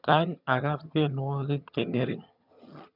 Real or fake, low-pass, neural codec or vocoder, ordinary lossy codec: fake; 5.4 kHz; vocoder, 22.05 kHz, 80 mel bands, HiFi-GAN; none